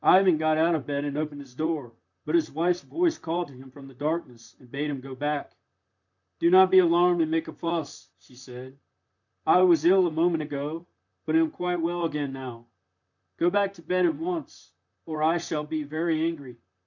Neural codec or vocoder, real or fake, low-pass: vocoder, 44.1 kHz, 128 mel bands, Pupu-Vocoder; fake; 7.2 kHz